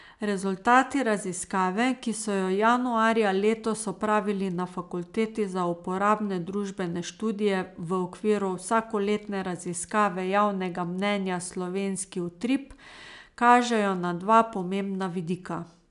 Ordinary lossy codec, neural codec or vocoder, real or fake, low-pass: AAC, 96 kbps; none; real; 10.8 kHz